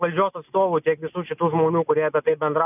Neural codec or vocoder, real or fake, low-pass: none; real; 3.6 kHz